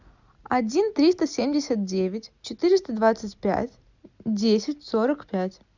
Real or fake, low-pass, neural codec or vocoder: real; 7.2 kHz; none